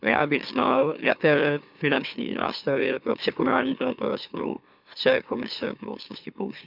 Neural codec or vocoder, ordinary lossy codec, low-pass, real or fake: autoencoder, 44.1 kHz, a latent of 192 numbers a frame, MeloTTS; none; 5.4 kHz; fake